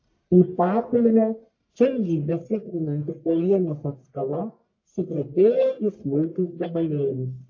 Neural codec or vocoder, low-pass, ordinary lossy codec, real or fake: codec, 44.1 kHz, 1.7 kbps, Pupu-Codec; 7.2 kHz; AAC, 48 kbps; fake